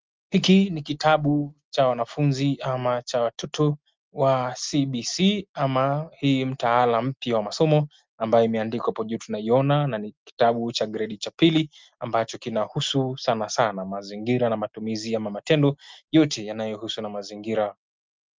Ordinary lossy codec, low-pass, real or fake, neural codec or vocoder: Opus, 24 kbps; 7.2 kHz; real; none